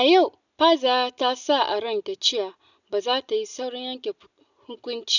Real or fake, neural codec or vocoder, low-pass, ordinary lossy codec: real; none; 7.2 kHz; none